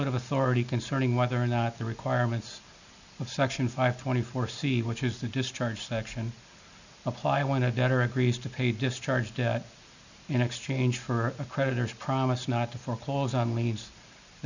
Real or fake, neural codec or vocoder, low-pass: real; none; 7.2 kHz